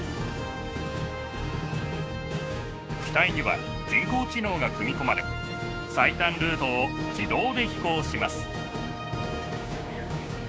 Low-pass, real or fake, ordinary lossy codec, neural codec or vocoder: none; fake; none; codec, 16 kHz, 6 kbps, DAC